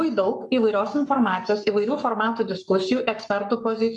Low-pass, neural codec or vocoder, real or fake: 10.8 kHz; codec, 44.1 kHz, 7.8 kbps, Pupu-Codec; fake